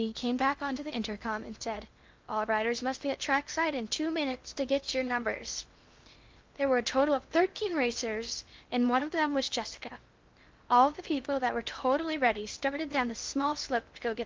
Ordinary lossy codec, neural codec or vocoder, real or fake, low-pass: Opus, 32 kbps; codec, 16 kHz in and 24 kHz out, 0.8 kbps, FocalCodec, streaming, 65536 codes; fake; 7.2 kHz